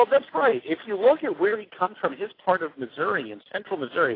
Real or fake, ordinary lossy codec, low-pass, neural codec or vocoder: real; AAC, 24 kbps; 5.4 kHz; none